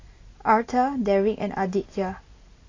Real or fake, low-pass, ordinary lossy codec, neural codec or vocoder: real; 7.2 kHz; AAC, 32 kbps; none